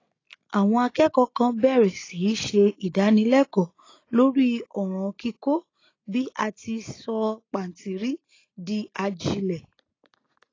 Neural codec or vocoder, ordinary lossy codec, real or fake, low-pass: none; AAC, 32 kbps; real; 7.2 kHz